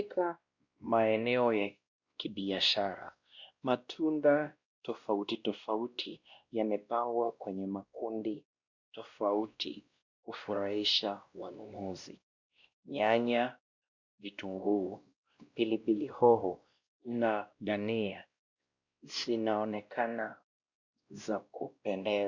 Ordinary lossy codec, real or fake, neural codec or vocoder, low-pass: Opus, 64 kbps; fake; codec, 16 kHz, 1 kbps, X-Codec, WavLM features, trained on Multilingual LibriSpeech; 7.2 kHz